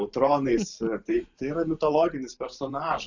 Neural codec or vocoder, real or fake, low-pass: vocoder, 44.1 kHz, 128 mel bands every 512 samples, BigVGAN v2; fake; 7.2 kHz